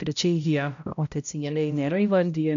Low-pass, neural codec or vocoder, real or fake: 7.2 kHz; codec, 16 kHz, 0.5 kbps, X-Codec, HuBERT features, trained on balanced general audio; fake